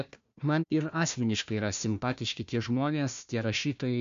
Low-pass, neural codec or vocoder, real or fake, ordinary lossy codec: 7.2 kHz; codec, 16 kHz, 1 kbps, FunCodec, trained on Chinese and English, 50 frames a second; fake; MP3, 64 kbps